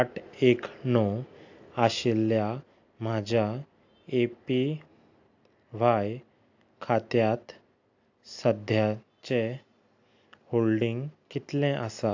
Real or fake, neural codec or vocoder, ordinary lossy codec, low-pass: real; none; AAC, 48 kbps; 7.2 kHz